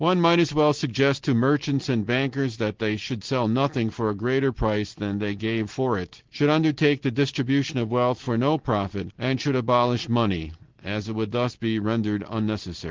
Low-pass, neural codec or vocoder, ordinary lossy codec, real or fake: 7.2 kHz; none; Opus, 16 kbps; real